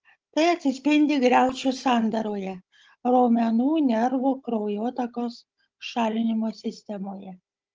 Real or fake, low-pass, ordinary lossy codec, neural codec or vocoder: fake; 7.2 kHz; Opus, 24 kbps; codec, 16 kHz, 16 kbps, FunCodec, trained on Chinese and English, 50 frames a second